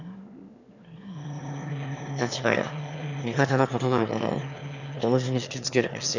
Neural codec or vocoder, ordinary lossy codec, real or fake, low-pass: autoencoder, 22.05 kHz, a latent of 192 numbers a frame, VITS, trained on one speaker; none; fake; 7.2 kHz